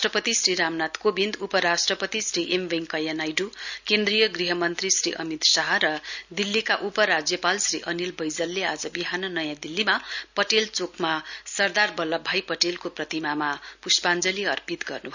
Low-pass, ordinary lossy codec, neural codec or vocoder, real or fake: 7.2 kHz; none; none; real